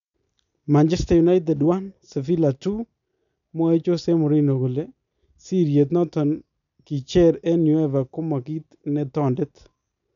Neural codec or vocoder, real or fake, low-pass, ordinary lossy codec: none; real; 7.2 kHz; none